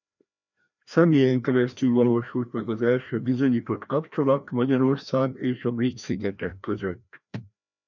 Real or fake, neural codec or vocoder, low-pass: fake; codec, 16 kHz, 1 kbps, FreqCodec, larger model; 7.2 kHz